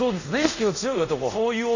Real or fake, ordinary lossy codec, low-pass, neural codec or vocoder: fake; none; 7.2 kHz; codec, 24 kHz, 0.5 kbps, DualCodec